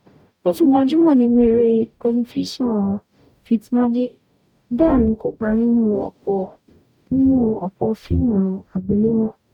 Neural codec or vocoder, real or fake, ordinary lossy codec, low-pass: codec, 44.1 kHz, 0.9 kbps, DAC; fake; none; 19.8 kHz